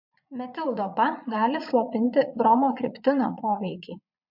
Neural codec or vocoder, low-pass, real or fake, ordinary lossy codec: vocoder, 22.05 kHz, 80 mel bands, Vocos; 5.4 kHz; fake; MP3, 48 kbps